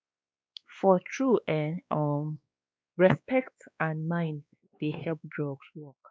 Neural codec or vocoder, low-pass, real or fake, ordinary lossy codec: codec, 16 kHz, 2 kbps, X-Codec, WavLM features, trained on Multilingual LibriSpeech; none; fake; none